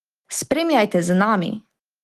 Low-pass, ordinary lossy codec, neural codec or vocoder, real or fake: 14.4 kHz; Opus, 24 kbps; autoencoder, 48 kHz, 128 numbers a frame, DAC-VAE, trained on Japanese speech; fake